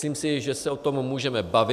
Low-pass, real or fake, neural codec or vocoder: 14.4 kHz; real; none